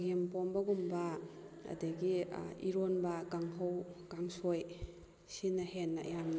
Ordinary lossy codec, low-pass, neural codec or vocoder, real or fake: none; none; none; real